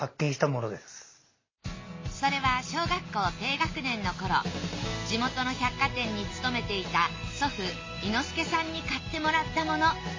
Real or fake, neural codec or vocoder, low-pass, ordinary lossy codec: real; none; 7.2 kHz; MP3, 32 kbps